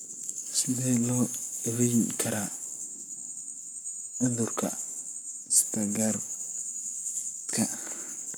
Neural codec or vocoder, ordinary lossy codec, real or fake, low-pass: codec, 44.1 kHz, 7.8 kbps, Pupu-Codec; none; fake; none